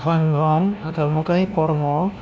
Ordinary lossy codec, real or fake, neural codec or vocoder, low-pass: none; fake; codec, 16 kHz, 1 kbps, FunCodec, trained on LibriTTS, 50 frames a second; none